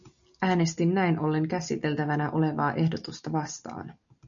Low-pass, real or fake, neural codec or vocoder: 7.2 kHz; real; none